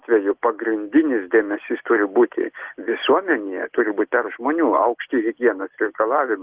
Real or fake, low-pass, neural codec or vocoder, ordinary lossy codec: real; 3.6 kHz; none; Opus, 16 kbps